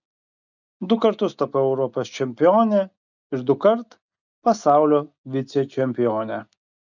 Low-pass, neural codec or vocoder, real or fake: 7.2 kHz; none; real